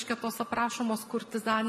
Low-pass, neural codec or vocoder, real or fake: 14.4 kHz; none; real